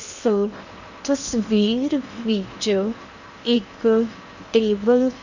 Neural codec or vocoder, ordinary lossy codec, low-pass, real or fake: codec, 16 kHz in and 24 kHz out, 0.8 kbps, FocalCodec, streaming, 65536 codes; none; 7.2 kHz; fake